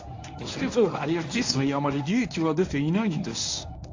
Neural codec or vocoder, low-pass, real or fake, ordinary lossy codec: codec, 24 kHz, 0.9 kbps, WavTokenizer, medium speech release version 1; 7.2 kHz; fake; AAC, 48 kbps